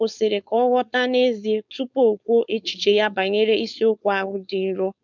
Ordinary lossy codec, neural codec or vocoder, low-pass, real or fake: none; codec, 16 kHz, 4.8 kbps, FACodec; 7.2 kHz; fake